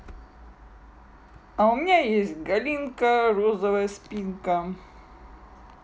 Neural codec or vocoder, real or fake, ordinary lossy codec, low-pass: none; real; none; none